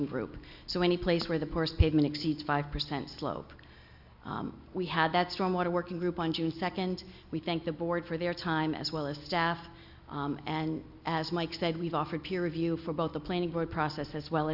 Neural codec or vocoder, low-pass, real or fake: none; 5.4 kHz; real